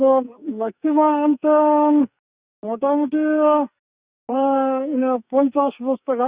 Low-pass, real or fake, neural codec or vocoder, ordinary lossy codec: 3.6 kHz; fake; codec, 44.1 kHz, 2.6 kbps, SNAC; Opus, 64 kbps